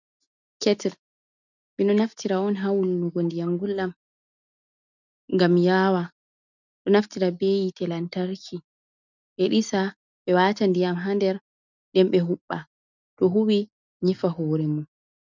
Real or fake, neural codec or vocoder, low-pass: real; none; 7.2 kHz